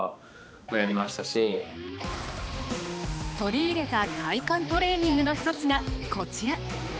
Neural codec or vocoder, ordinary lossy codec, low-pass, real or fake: codec, 16 kHz, 2 kbps, X-Codec, HuBERT features, trained on balanced general audio; none; none; fake